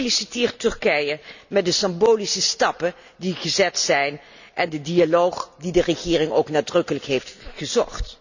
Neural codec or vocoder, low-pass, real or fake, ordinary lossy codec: none; 7.2 kHz; real; none